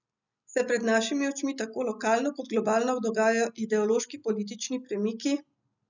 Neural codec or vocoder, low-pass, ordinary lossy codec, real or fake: none; 7.2 kHz; none; real